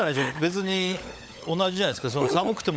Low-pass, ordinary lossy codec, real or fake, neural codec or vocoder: none; none; fake; codec, 16 kHz, 16 kbps, FunCodec, trained on LibriTTS, 50 frames a second